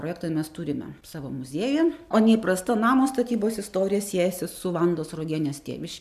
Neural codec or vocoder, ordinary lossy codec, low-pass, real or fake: none; MP3, 96 kbps; 14.4 kHz; real